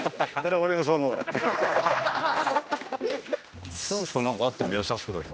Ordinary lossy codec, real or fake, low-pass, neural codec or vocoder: none; fake; none; codec, 16 kHz, 1 kbps, X-Codec, HuBERT features, trained on general audio